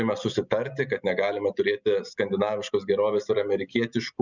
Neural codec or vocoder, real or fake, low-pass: none; real; 7.2 kHz